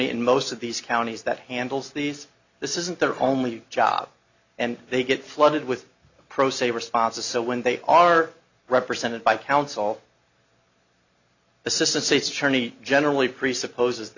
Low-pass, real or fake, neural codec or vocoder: 7.2 kHz; real; none